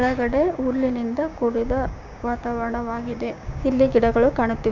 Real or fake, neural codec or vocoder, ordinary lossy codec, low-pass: fake; codec, 16 kHz, 6 kbps, DAC; none; 7.2 kHz